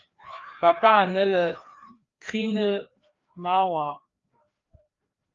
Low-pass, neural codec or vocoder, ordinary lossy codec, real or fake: 7.2 kHz; codec, 16 kHz, 2 kbps, FreqCodec, larger model; Opus, 32 kbps; fake